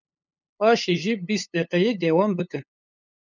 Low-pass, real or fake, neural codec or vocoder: 7.2 kHz; fake; codec, 16 kHz, 8 kbps, FunCodec, trained on LibriTTS, 25 frames a second